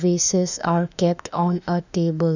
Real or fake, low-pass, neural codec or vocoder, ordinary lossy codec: fake; 7.2 kHz; autoencoder, 48 kHz, 32 numbers a frame, DAC-VAE, trained on Japanese speech; none